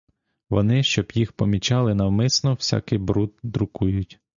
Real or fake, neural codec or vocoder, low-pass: real; none; 7.2 kHz